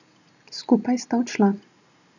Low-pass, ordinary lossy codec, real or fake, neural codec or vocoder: 7.2 kHz; none; real; none